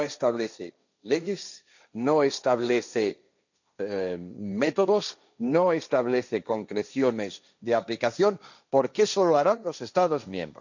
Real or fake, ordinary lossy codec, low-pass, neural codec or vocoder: fake; none; 7.2 kHz; codec, 16 kHz, 1.1 kbps, Voila-Tokenizer